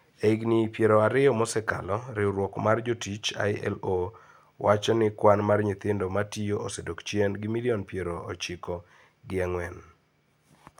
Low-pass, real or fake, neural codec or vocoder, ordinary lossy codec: 19.8 kHz; real; none; none